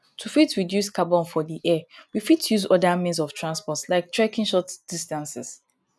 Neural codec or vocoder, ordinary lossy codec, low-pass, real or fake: none; none; none; real